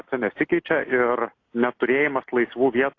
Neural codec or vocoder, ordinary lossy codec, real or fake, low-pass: none; AAC, 32 kbps; real; 7.2 kHz